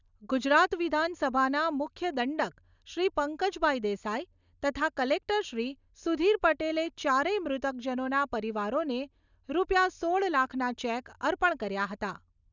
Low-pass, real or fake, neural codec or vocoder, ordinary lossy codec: 7.2 kHz; real; none; none